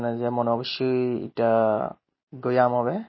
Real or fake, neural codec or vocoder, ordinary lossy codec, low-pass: real; none; MP3, 24 kbps; 7.2 kHz